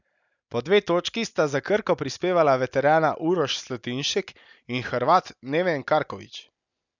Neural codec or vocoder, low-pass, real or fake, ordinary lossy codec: none; 7.2 kHz; real; none